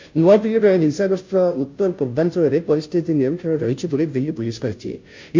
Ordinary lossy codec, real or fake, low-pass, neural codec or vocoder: MP3, 48 kbps; fake; 7.2 kHz; codec, 16 kHz, 0.5 kbps, FunCodec, trained on Chinese and English, 25 frames a second